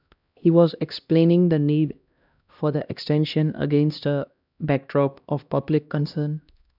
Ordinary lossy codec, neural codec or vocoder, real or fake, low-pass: none; codec, 16 kHz, 1 kbps, X-Codec, HuBERT features, trained on LibriSpeech; fake; 5.4 kHz